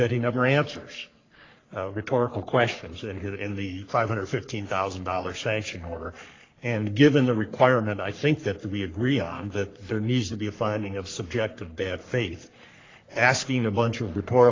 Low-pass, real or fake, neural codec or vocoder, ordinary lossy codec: 7.2 kHz; fake; codec, 44.1 kHz, 3.4 kbps, Pupu-Codec; AAC, 32 kbps